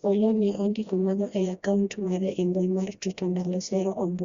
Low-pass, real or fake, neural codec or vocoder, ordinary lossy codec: 7.2 kHz; fake; codec, 16 kHz, 1 kbps, FreqCodec, smaller model; Opus, 64 kbps